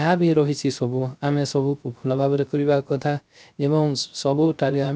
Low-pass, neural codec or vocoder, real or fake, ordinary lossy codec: none; codec, 16 kHz, 0.3 kbps, FocalCodec; fake; none